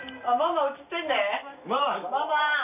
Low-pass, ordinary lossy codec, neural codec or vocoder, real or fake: 3.6 kHz; none; none; real